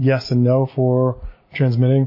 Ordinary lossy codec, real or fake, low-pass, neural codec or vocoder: MP3, 24 kbps; real; 5.4 kHz; none